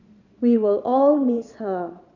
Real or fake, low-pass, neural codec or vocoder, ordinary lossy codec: fake; 7.2 kHz; vocoder, 22.05 kHz, 80 mel bands, Vocos; none